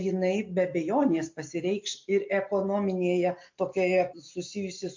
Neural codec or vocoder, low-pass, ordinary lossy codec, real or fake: none; 7.2 kHz; MP3, 48 kbps; real